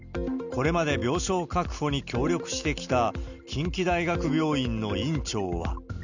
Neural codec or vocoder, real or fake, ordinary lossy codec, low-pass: none; real; none; 7.2 kHz